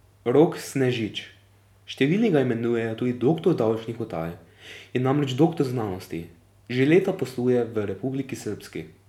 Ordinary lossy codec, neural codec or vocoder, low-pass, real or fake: none; none; 19.8 kHz; real